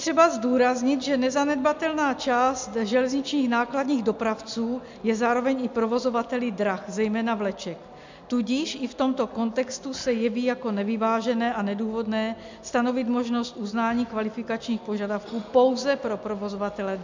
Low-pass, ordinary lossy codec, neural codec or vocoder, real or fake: 7.2 kHz; MP3, 64 kbps; none; real